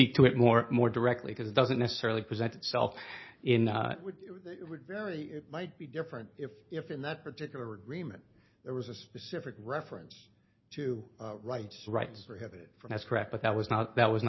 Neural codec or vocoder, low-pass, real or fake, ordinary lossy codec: none; 7.2 kHz; real; MP3, 24 kbps